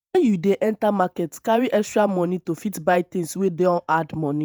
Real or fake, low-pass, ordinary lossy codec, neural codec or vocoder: fake; none; none; vocoder, 48 kHz, 128 mel bands, Vocos